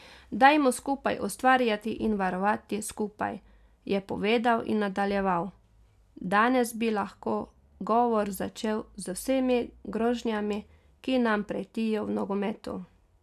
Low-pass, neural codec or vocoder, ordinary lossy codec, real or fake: 14.4 kHz; none; none; real